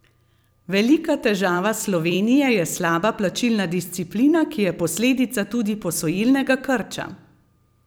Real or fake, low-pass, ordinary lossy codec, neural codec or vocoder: fake; none; none; vocoder, 44.1 kHz, 128 mel bands every 256 samples, BigVGAN v2